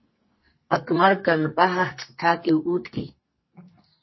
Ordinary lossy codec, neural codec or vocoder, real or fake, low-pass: MP3, 24 kbps; codec, 44.1 kHz, 2.6 kbps, SNAC; fake; 7.2 kHz